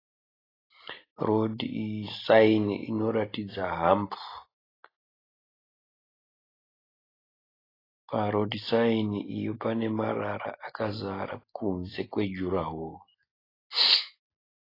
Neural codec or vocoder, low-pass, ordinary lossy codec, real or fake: none; 5.4 kHz; AAC, 24 kbps; real